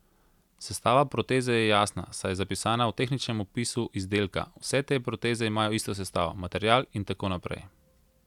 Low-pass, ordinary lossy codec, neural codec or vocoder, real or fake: 19.8 kHz; none; none; real